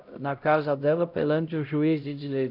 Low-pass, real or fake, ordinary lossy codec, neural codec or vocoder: 5.4 kHz; fake; none; codec, 16 kHz, 0.5 kbps, X-Codec, HuBERT features, trained on LibriSpeech